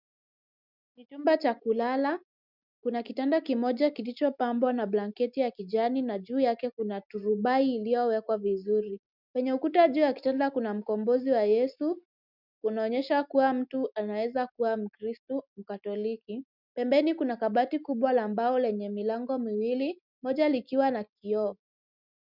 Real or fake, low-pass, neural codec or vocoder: real; 5.4 kHz; none